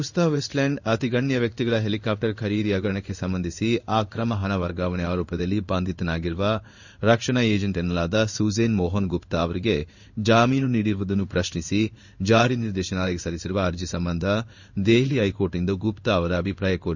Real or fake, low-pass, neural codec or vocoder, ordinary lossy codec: fake; 7.2 kHz; codec, 16 kHz in and 24 kHz out, 1 kbps, XY-Tokenizer; none